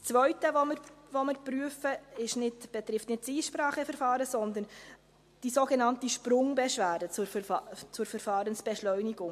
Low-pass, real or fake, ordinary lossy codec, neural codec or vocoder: 14.4 kHz; real; MP3, 64 kbps; none